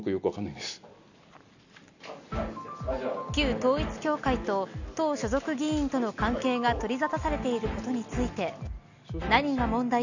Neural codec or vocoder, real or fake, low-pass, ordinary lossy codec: none; real; 7.2 kHz; none